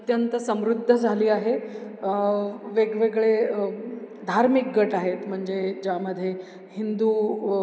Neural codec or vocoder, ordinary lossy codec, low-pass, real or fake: none; none; none; real